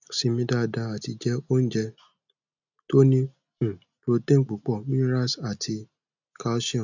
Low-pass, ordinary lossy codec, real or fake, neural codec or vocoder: 7.2 kHz; none; real; none